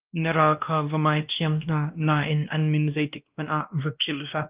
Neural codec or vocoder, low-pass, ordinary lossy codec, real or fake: codec, 16 kHz, 1 kbps, X-Codec, WavLM features, trained on Multilingual LibriSpeech; 3.6 kHz; Opus, 32 kbps; fake